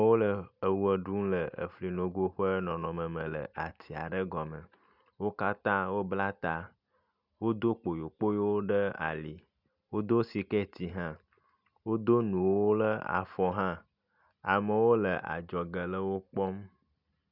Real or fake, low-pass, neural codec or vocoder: real; 5.4 kHz; none